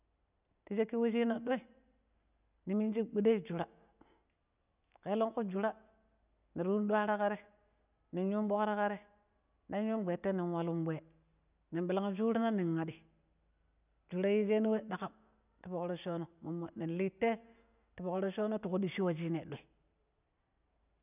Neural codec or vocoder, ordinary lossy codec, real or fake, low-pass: none; none; real; 3.6 kHz